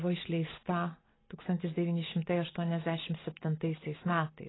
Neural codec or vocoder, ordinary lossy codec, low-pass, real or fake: none; AAC, 16 kbps; 7.2 kHz; real